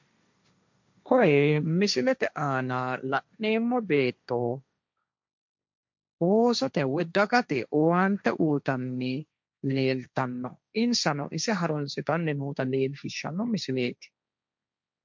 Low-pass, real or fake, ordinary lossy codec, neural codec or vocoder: 7.2 kHz; fake; MP3, 64 kbps; codec, 16 kHz, 1.1 kbps, Voila-Tokenizer